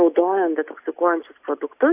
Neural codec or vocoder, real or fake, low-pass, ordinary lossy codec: none; real; 3.6 kHz; AAC, 32 kbps